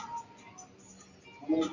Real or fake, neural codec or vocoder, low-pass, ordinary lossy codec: real; none; 7.2 kHz; AAC, 48 kbps